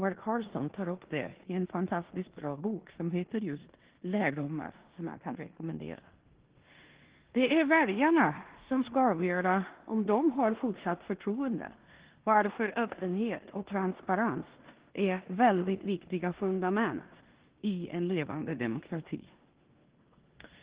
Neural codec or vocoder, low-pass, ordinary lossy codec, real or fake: codec, 16 kHz in and 24 kHz out, 0.9 kbps, LongCat-Audio-Codec, four codebook decoder; 3.6 kHz; Opus, 16 kbps; fake